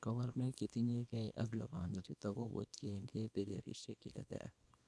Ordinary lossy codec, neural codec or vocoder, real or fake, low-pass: none; codec, 24 kHz, 0.9 kbps, WavTokenizer, small release; fake; none